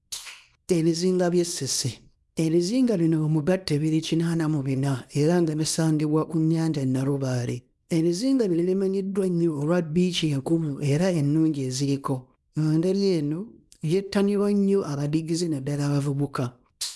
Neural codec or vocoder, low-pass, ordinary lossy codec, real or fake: codec, 24 kHz, 0.9 kbps, WavTokenizer, small release; none; none; fake